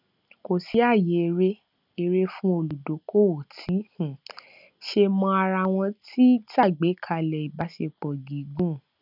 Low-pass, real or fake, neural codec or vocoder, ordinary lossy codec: 5.4 kHz; real; none; none